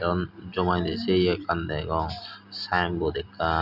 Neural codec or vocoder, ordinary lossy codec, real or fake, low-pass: none; none; real; 5.4 kHz